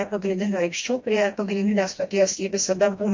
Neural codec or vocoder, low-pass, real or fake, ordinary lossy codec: codec, 16 kHz, 1 kbps, FreqCodec, smaller model; 7.2 kHz; fake; MP3, 48 kbps